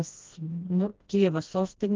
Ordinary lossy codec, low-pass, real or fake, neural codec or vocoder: Opus, 24 kbps; 7.2 kHz; fake; codec, 16 kHz, 1 kbps, FreqCodec, smaller model